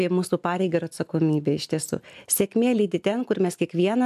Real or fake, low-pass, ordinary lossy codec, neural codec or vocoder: real; 14.4 kHz; AAC, 96 kbps; none